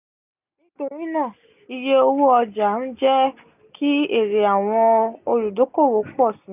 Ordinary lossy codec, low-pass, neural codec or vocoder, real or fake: none; 3.6 kHz; none; real